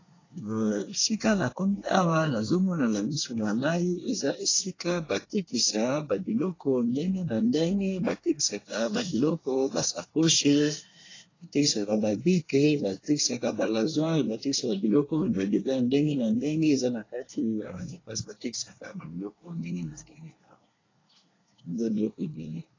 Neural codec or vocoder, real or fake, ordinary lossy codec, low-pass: codec, 24 kHz, 1 kbps, SNAC; fake; AAC, 32 kbps; 7.2 kHz